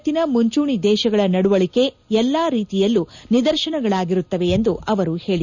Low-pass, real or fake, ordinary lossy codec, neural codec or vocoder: 7.2 kHz; real; none; none